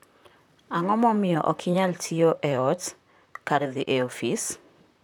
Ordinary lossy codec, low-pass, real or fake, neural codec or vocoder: none; 19.8 kHz; fake; vocoder, 44.1 kHz, 128 mel bands, Pupu-Vocoder